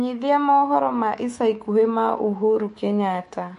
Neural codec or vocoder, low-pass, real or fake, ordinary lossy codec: codec, 24 kHz, 3.1 kbps, DualCodec; 10.8 kHz; fake; MP3, 48 kbps